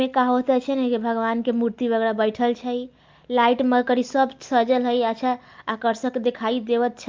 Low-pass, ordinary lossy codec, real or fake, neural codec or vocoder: 7.2 kHz; Opus, 24 kbps; real; none